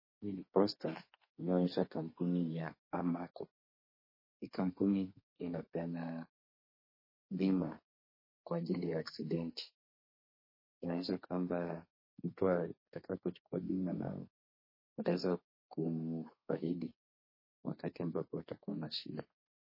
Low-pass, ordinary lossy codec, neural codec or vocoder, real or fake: 5.4 kHz; MP3, 24 kbps; codec, 32 kHz, 1.9 kbps, SNAC; fake